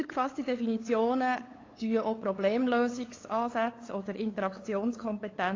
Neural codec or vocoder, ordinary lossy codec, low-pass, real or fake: codec, 16 kHz, 8 kbps, FunCodec, trained on LibriTTS, 25 frames a second; AAC, 32 kbps; 7.2 kHz; fake